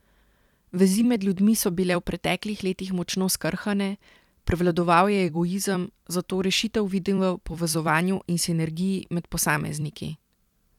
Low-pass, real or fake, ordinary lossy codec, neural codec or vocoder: 19.8 kHz; fake; none; vocoder, 44.1 kHz, 128 mel bands every 256 samples, BigVGAN v2